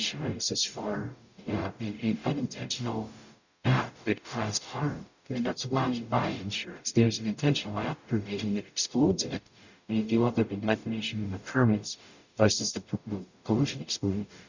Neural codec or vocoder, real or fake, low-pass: codec, 44.1 kHz, 0.9 kbps, DAC; fake; 7.2 kHz